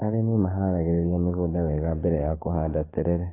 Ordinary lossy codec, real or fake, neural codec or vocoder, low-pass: AAC, 16 kbps; fake; codec, 44.1 kHz, 7.8 kbps, DAC; 3.6 kHz